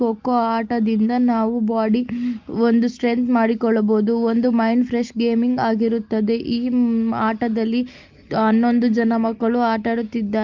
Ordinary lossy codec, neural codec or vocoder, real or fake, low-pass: Opus, 32 kbps; none; real; 7.2 kHz